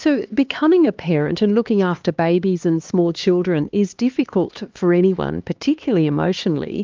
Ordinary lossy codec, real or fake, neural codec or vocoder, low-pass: Opus, 32 kbps; fake; codec, 16 kHz, 2 kbps, X-Codec, HuBERT features, trained on LibriSpeech; 7.2 kHz